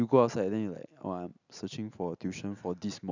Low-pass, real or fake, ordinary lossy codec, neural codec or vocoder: 7.2 kHz; real; none; none